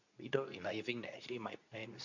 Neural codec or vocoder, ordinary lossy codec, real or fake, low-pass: codec, 24 kHz, 0.9 kbps, WavTokenizer, medium speech release version 2; none; fake; 7.2 kHz